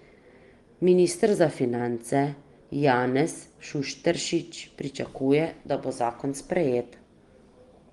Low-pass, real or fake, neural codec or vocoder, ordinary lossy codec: 10.8 kHz; real; none; Opus, 24 kbps